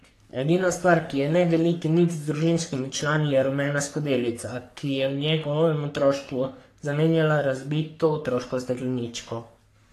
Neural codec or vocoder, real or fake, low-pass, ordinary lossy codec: codec, 44.1 kHz, 3.4 kbps, Pupu-Codec; fake; 14.4 kHz; AAC, 64 kbps